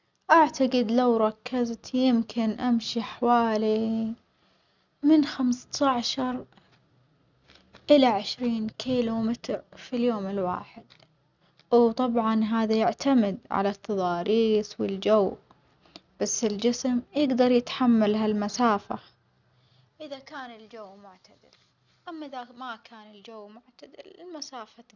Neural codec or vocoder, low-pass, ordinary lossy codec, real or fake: none; 7.2 kHz; none; real